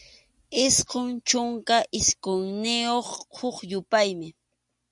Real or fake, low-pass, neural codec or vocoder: real; 10.8 kHz; none